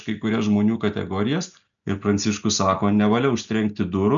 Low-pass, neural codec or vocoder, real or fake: 7.2 kHz; none; real